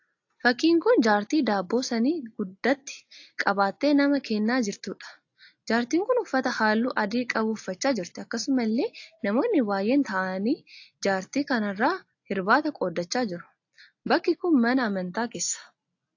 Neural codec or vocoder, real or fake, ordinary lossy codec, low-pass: none; real; AAC, 48 kbps; 7.2 kHz